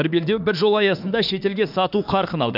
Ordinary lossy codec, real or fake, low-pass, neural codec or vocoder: none; fake; 5.4 kHz; autoencoder, 48 kHz, 32 numbers a frame, DAC-VAE, trained on Japanese speech